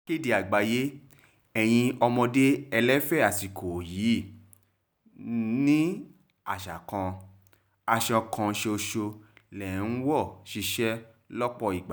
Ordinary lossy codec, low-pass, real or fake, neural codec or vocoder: none; none; real; none